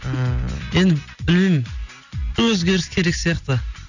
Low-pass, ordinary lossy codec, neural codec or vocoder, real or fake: 7.2 kHz; none; none; real